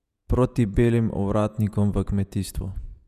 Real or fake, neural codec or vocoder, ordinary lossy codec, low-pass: fake; vocoder, 48 kHz, 128 mel bands, Vocos; none; 14.4 kHz